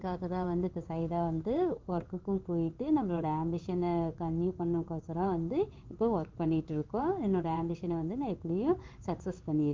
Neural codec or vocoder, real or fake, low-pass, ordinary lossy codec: codec, 16 kHz in and 24 kHz out, 1 kbps, XY-Tokenizer; fake; 7.2 kHz; Opus, 24 kbps